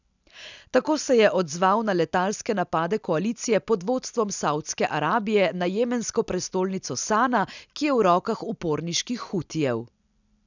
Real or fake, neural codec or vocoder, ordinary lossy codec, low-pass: real; none; none; 7.2 kHz